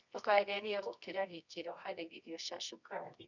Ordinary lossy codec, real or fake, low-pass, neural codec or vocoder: none; fake; 7.2 kHz; codec, 24 kHz, 0.9 kbps, WavTokenizer, medium music audio release